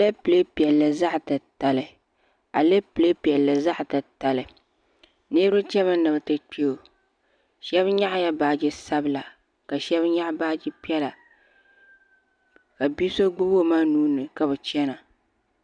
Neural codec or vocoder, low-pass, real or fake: none; 9.9 kHz; real